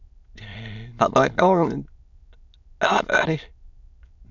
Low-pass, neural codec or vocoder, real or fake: 7.2 kHz; autoencoder, 22.05 kHz, a latent of 192 numbers a frame, VITS, trained on many speakers; fake